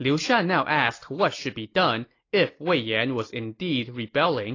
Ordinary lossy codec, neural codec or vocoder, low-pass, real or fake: AAC, 32 kbps; none; 7.2 kHz; real